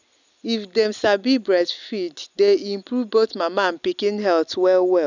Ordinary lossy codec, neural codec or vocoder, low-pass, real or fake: none; none; 7.2 kHz; real